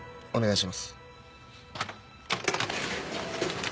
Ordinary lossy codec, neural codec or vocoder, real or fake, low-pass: none; none; real; none